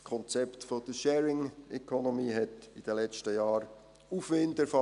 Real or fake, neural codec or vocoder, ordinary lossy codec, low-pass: real; none; none; 10.8 kHz